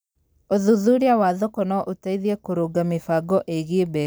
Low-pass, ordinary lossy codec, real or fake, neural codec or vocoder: none; none; real; none